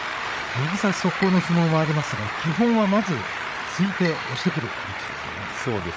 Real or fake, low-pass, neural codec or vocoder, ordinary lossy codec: fake; none; codec, 16 kHz, 8 kbps, FreqCodec, larger model; none